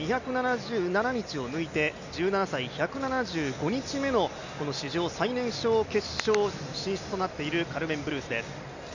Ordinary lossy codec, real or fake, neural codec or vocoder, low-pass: none; real; none; 7.2 kHz